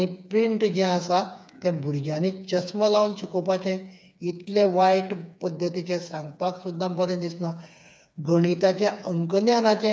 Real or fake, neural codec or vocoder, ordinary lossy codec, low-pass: fake; codec, 16 kHz, 4 kbps, FreqCodec, smaller model; none; none